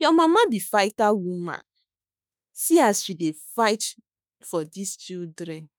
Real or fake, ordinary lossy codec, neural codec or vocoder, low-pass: fake; none; autoencoder, 48 kHz, 32 numbers a frame, DAC-VAE, trained on Japanese speech; none